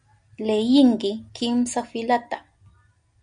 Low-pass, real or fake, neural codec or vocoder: 9.9 kHz; real; none